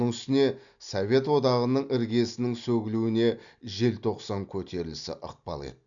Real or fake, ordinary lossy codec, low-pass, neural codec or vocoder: real; none; 7.2 kHz; none